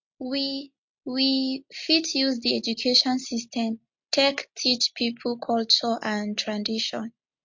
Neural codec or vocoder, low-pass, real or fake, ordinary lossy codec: none; 7.2 kHz; real; MP3, 48 kbps